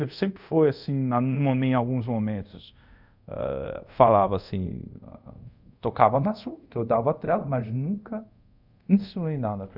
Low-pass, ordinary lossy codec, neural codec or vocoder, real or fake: 5.4 kHz; none; codec, 24 kHz, 0.5 kbps, DualCodec; fake